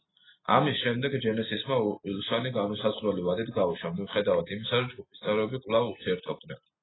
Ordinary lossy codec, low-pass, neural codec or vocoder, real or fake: AAC, 16 kbps; 7.2 kHz; none; real